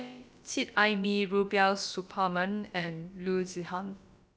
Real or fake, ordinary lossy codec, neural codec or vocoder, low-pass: fake; none; codec, 16 kHz, about 1 kbps, DyCAST, with the encoder's durations; none